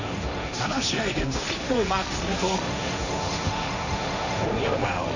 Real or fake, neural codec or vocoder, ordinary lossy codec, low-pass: fake; codec, 16 kHz, 1.1 kbps, Voila-Tokenizer; none; 7.2 kHz